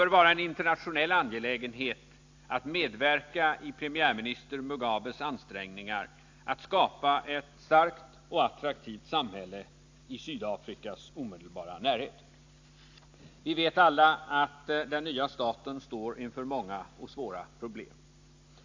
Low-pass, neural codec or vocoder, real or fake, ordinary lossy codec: 7.2 kHz; none; real; AAC, 48 kbps